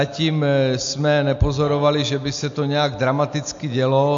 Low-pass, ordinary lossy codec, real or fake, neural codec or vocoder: 7.2 kHz; AAC, 64 kbps; real; none